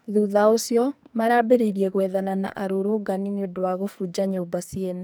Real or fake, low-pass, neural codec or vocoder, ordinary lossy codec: fake; none; codec, 44.1 kHz, 2.6 kbps, SNAC; none